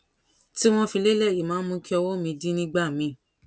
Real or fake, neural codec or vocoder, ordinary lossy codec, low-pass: real; none; none; none